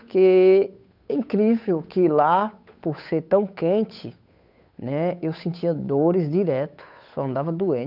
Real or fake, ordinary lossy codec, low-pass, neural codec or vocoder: fake; none; 5.4 kHz; codec, 16 kHz, 8 kbps, FunCodec, trained on Chinese and English, 25 frames a second